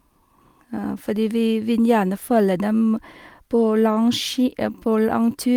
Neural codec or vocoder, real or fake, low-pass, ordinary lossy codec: none; real; 19.8 kHz; Opus, 32 kbps